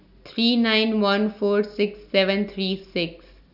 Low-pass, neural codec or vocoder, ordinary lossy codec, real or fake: 5.4 kHz; none; none; real